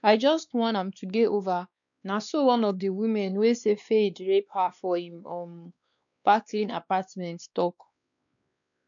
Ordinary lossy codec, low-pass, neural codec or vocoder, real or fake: none; 7.2 kHz; codec, 16 kHz, 2 kbps, X-Codec, WavLM features, trained on Multilingual LibriSpeech; fake